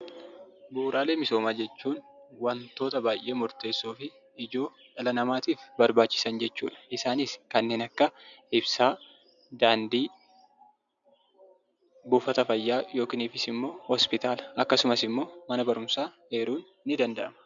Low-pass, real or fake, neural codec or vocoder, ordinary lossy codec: 7.2 kHz; real; none; MP3, 96 kbps